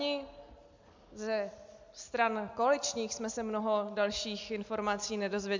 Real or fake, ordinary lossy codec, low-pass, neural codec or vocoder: real; MP3, 64 kbps; 7.2 kHz; none